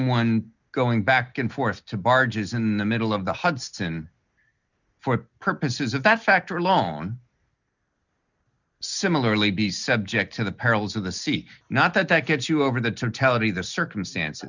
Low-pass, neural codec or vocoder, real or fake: 7.2 kHz; none; real